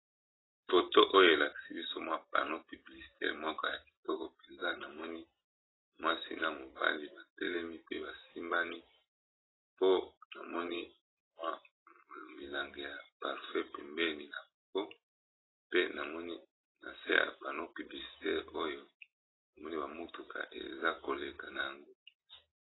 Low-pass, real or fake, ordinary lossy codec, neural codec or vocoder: 7.2 kHz; real; AAC, 16 kbps; none